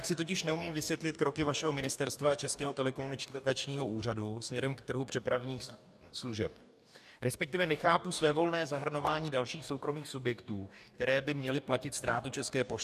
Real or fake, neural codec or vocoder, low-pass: fake; codec, 44.1 kHz, 2.6 kbps, DAC; 14.4 kHz